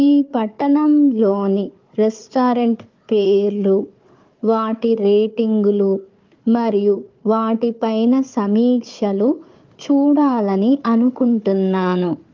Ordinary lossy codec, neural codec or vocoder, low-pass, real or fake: Opus, 32 kbps; codec, 16 kHz, 4 kbps, FunCodec, trained on Chinese and English, 50 frames a second; 7.2 kHz; fake